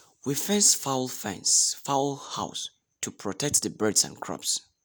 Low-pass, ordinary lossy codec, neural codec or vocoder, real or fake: none; none; none; real